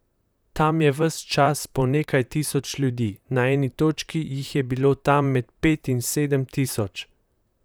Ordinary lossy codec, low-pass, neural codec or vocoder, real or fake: none; none; vocoder, 44.1 kHz, 128 mel bands, Pupu-Vocoder; fake